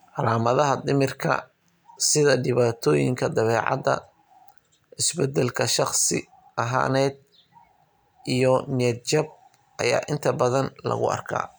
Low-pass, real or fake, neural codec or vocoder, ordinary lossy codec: none; real; none; none